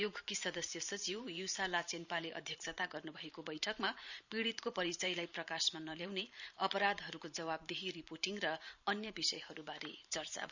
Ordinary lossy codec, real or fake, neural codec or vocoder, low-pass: none; fake; vocoder, 44.1 kHz, 128 mel bands every 512 samples, BigVGAN v2; 7.2 kHz